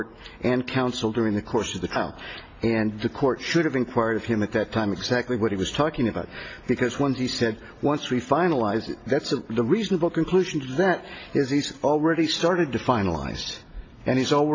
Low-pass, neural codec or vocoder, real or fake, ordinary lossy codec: 7.2 kHz; none; real; AAC, 32 kbps